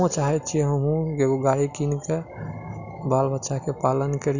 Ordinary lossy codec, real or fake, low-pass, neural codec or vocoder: none; real; 7.2 kHz; none